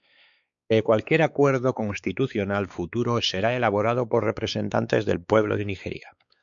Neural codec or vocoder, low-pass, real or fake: codec, 16 kHz, 4 kbps, X-Codec, WavLM features, trained on Multilingual LibriSpeech; 7.2 kHz; fake